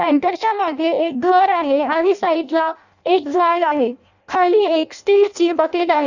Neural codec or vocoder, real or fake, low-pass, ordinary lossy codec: codec, 16 kHz in and 24 kHz out, 0.6 kbps, FireRedTTS-2 codec; fake; 7.2 kHz; none